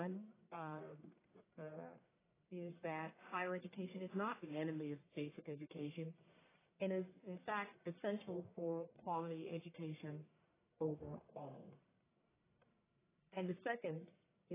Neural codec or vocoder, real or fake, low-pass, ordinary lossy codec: codec, 44.1 kHz, 1.7 kbps, Pupu-Codec; fake; 3.6 kHz; AAC, 16 kbps